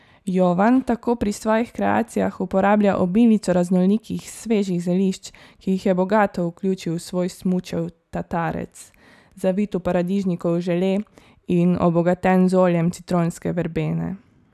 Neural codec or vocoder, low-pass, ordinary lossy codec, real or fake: none; 14.4 kHz; none; real